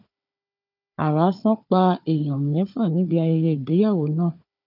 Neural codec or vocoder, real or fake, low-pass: codec, 16 kHz, 4 kbps, FunCodec, trained on Chinese and English, 50 frames a second; fake; 5.4 kHz